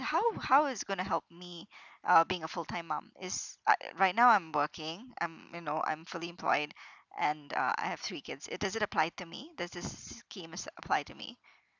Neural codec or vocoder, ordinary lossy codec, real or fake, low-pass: none; none; real; 7.2 kHz